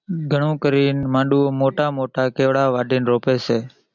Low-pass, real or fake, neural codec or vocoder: 7.2 kHz; real; none